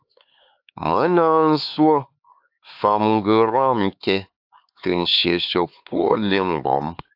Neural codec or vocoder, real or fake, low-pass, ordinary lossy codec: codec, 16 kHz, 4 kbps, X-Codec, HuBERT features, trained on LibriSpeech; fake; 5.4 kHz; AAC, 48 kbps